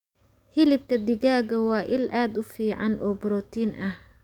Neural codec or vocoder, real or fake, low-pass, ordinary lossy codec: codec, 44.1 kHz, 7.8 kbps, DAC; fake; 19.8 kHz; none